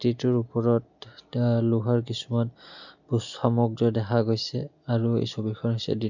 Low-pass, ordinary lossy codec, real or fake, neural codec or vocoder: 7.2 kHz; none; real; none